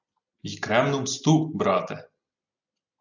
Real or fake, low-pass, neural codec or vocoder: real; 7.2 kHz; none